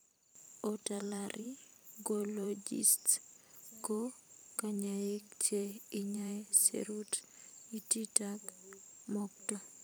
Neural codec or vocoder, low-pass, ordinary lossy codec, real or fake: vocoder, 44.1 kHz, 128 mel bands every 512 samples, BigVGAN v2; none; none; fake